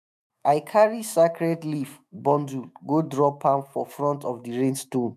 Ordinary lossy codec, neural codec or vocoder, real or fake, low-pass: none; autoencoder, 48 kHz, 128 numbers a frame, DAC-VAE, trained on Japanese speech; fake; 14.4 kHz